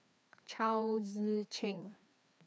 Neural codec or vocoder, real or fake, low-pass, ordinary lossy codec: codec, 16 kHz, 2 kbps, FreqCodec, larger model; fake; none; none